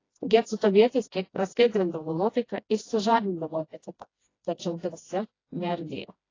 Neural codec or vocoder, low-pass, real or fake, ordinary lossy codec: codec, 16 kHz, 1 kbps, FreqCodec, smaller model; 7.2 kHz; fake; AAC, 32 kbps